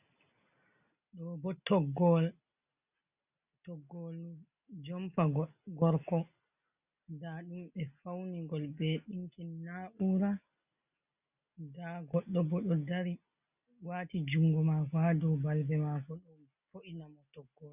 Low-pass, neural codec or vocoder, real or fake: 3.6 kHz; none; real